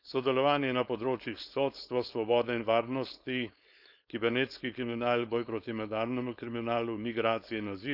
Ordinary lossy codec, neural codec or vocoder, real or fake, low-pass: none; codec, 16 kHz, 4.8 kbps, FACodec; fake; 5.4 kHz